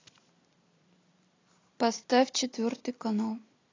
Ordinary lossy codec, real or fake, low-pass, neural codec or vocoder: AAC, 32 kbps; real; 7.2 kHz; none